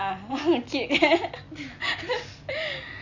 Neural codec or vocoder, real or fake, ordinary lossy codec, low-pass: none; real; none; 7.2 kHz